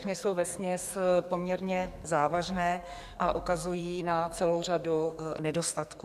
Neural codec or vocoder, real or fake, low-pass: codec, 44.1 kHz, 2.6 kbps, SNAC; fake; 14.4 kHz